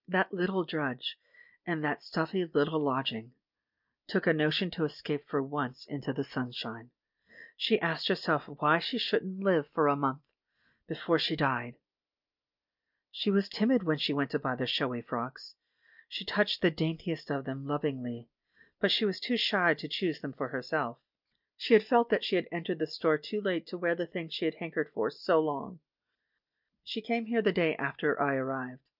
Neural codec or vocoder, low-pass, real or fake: none; 5.4 kHz; real